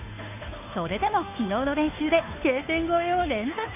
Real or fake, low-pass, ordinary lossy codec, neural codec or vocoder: fake; 3.6 kHz; none; codec, 16 kHz, 2 kbps, FunCodec, trained on Chinese and English, 25 frames a second